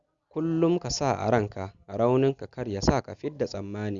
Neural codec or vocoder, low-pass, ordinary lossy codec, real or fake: none; 7.2 kHz; Opus, 64 kbps; real